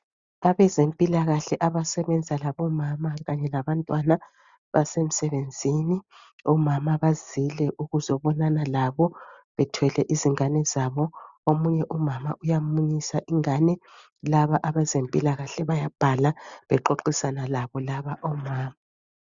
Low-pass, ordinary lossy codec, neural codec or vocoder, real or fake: 7.2 kHz; Opus, 64 kbps; none; real